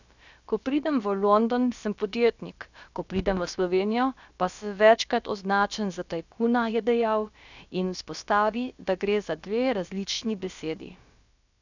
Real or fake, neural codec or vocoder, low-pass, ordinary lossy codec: fake; codec, 16 kHz, about 1 kbps, DyCAST, with the encoder's durations; 7.2 kHz; none